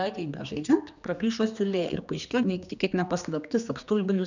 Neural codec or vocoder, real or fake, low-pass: codec, 16 kHz, 2 kbps, X-Codec, HuBERT features, trained on general audio; fake; 7.2 kHz